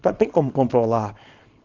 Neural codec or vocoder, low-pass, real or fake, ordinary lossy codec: codec, 24 kHz, 0.9 kbps, WavTokenizer, small release; 7.2 kHz; fake; Opus, 32 kbps